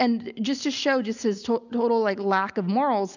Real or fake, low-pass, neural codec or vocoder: real; 7.2 kHz; none